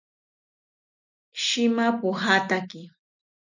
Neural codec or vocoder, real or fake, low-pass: none; real; 7.2 kHz